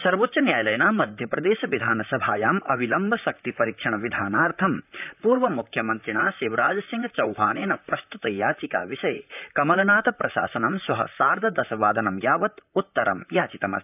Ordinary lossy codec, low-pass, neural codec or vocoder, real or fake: none; 3.6 kHz; vocoder, 44.1 kHz, 128 mel bands, Pupu-Vocoder; fake